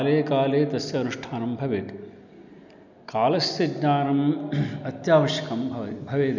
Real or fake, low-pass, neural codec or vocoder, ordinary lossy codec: real; 7.2 kHz; none; none